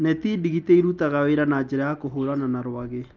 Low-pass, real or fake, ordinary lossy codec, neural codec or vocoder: 7.2 kHz; real; Opus, 24 kbps; none